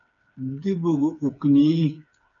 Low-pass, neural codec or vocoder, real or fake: 7.2 kHz; codec, 16 kHz, 4 kbps, FreqCodec, smaller model; fake